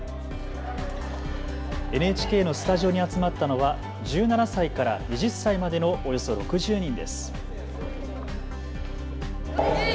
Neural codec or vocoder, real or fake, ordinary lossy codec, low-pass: none; real; none; none